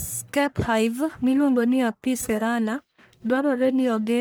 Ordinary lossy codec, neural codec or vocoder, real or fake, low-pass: none; codec, 44.1 kHz, 1.7 kbps, Pupu-Codec; fake; none